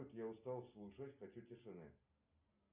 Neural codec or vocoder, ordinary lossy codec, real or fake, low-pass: none; Opus, 64 kbps; real; 3.6 kHz